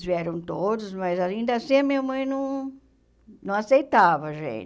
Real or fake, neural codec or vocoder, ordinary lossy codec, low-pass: real; none; none; none